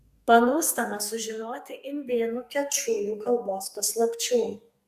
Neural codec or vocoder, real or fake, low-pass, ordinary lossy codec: codec, 32 kHz, 1.9 kbps, SNAC; fake; 14.4 kHz; Opus, 64 kbps